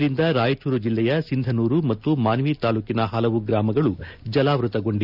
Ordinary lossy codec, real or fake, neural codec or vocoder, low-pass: none; real; none; 5.4 kHz